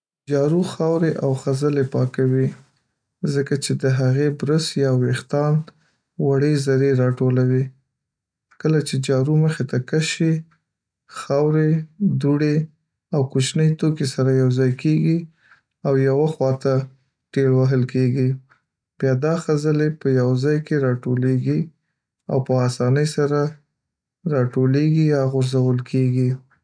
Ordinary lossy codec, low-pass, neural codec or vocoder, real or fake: none; 9.9 kHz; none; real